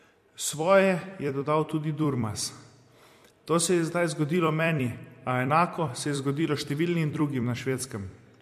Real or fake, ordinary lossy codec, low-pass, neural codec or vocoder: fake; MP3, 64 kbps; 14.4 kHz; vocoder, 44.1 kHz, 128 mel bands every 256 samples, BigVGAN v2